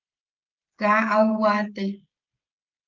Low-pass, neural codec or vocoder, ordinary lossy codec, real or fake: 7.2 kHz; codec, 16 kHz, 16 kbps, FreqCodec, smaller model; Opus, 24 kbps; fake